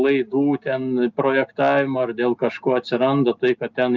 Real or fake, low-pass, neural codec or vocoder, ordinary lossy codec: real; 7.2 kHz; none; Opus, 32 kbps